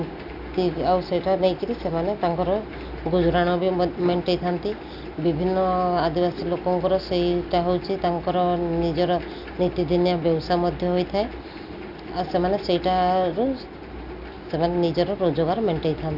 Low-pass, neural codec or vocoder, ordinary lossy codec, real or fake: 5.4 kHz; none; none; real